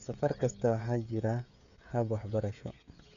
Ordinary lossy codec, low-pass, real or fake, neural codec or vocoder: none; 7.2 kHz; fake; codec, 16 kHz, 16 kbps, FreqCodec, smaller model